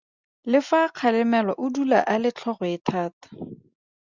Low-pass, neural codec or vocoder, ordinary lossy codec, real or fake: 7.2 kHz; none; Opus, 64 kbps; real